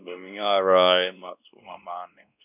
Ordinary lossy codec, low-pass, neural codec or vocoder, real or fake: none; 3.6 kHz; codec, 16 kHz, 1 kbps, X-Codec, WavLM features, trained on Multilingual LibriSpeech; fake